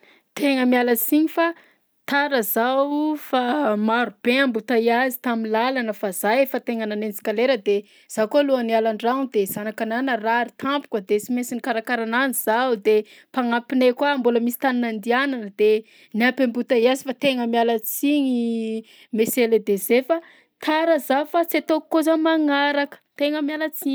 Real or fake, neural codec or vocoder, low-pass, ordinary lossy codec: real; none; none; none